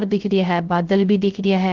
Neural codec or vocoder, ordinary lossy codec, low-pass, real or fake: codec, 16 kHz, 0.3 kbps, FocalCodec; Opus, 16 kbps; 7.2 kHz; fake